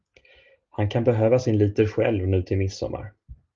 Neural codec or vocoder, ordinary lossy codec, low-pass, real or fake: none; Opus, 32 kbps; 7.2 kHz; real